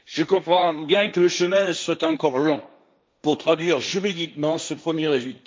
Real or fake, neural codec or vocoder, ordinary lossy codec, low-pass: fake; codec, 16 kHz, 1.1 kbps, Voila-Tokenizer; none; none